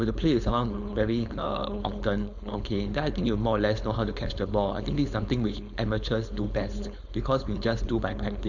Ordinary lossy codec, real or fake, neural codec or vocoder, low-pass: none; fake; codec, 16 kHz, 4.8 kbps, FACodec; 7.2 kHz